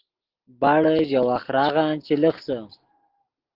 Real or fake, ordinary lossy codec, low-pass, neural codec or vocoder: real; Opus, 16 kbps; 5.4 kHz; none